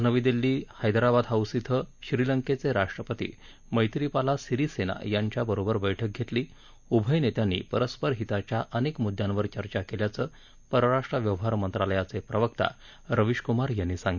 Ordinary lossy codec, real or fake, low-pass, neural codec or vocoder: none; real; 7.2 kHz; none